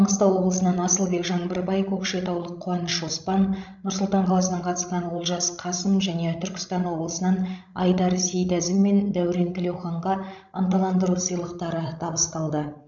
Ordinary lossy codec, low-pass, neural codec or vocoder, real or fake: none; 7.2 kHz; codec, 16 kHz, 16 kbps, FreqCodec, larger model; fake